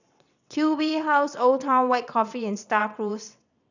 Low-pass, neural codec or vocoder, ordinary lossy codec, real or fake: 7.2 kHz; vocoder, 22.05 kHz, 80 mel bands, WaveNeXt; none; fake